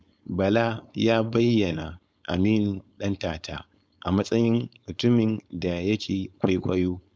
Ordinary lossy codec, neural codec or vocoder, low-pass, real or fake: none; codec, 16 kHz, 4.8 kbps, FACodec; none; fake